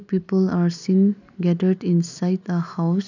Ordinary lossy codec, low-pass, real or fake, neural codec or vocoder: none; 7.2 kHz; real; none